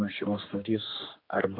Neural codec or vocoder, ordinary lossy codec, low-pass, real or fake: codec, 16 kHz, 2 kbps, X-Codec, HuBERT features, trained on general audio; AAC, 32 kbps; 5.4 kHz; fake